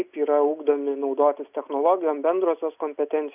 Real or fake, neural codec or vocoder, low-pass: real; none; 3.6 kHz